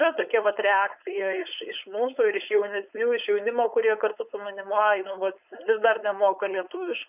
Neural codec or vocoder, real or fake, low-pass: codec, 16 kHz, 4.8 kbps, FACodec; fake; 3.6 kHz